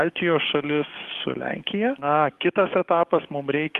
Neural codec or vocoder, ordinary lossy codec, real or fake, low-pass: codec, 44.1 kHz, 7.8 kbps, DAC; Opus, 24 kbps; fake; 14.4 kHz